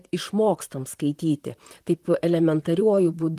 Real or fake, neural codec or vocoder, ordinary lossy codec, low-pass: fake; vocoder, 44.1 kHz, 128 mel bands, Pupu-Vocoder; Opus, 24 kbps; 14.4 kHz